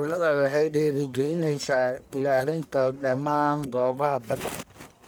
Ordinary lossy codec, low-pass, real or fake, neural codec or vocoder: none; none; fake; codec, 44.1 kHz, 1.7 kbps, Pupu-Codec